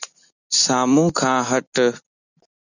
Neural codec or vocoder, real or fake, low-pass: none; real; 7.2 kHz